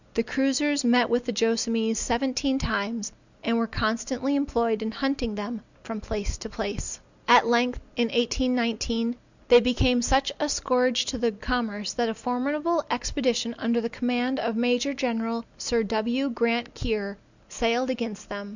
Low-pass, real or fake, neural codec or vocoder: 7.2 kHz; real; none